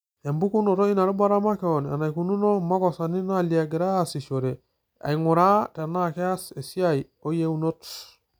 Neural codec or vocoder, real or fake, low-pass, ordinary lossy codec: none; real; none; none